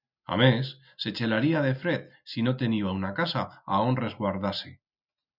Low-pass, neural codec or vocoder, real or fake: 5.4 kHz; none; real